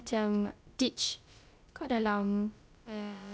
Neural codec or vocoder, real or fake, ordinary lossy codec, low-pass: codec, 16 kHz, about 1 kbps, DyCAST, with the encoder's durations; fake; none; none